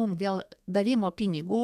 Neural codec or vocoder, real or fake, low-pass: codec, 32 kHz, 1.9 kbps, SNAC; fake; 14.4 kHz